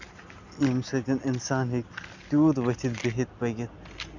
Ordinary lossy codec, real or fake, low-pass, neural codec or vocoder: none; real; 7.2 kHz; none